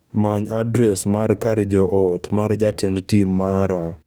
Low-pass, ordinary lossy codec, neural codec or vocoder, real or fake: none; none; codec, 44.1 kHz, 2.6 kbps, DAC; fake